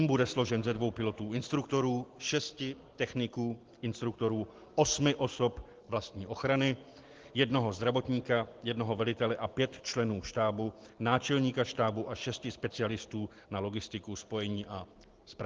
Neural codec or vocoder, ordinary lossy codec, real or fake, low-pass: none; Opus, 16 kbps; real; 7.2 kHz